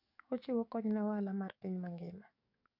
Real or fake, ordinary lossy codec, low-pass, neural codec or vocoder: fake; AAC, 48 kbps; 5.4 kHz; codec, 44.1 kHz, 7.8 kbps, DAC